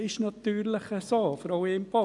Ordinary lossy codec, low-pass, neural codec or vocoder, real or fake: none; 10.8 kHz; none; real